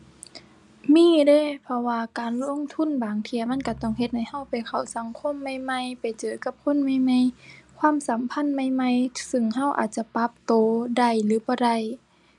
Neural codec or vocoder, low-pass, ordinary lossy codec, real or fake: none; 10.8 kHz; none; real